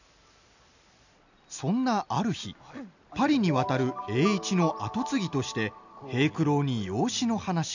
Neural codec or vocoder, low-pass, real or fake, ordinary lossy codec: none; 7.2 kHz; real; none